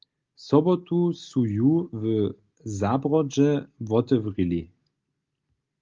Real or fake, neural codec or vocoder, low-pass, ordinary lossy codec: real; none; 7.2 kHz; Opus, 32 kbps